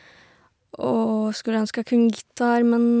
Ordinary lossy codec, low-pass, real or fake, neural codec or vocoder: none; none; real; none